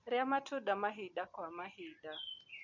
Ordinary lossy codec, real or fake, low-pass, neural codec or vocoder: none; real; 7.2 kHz; none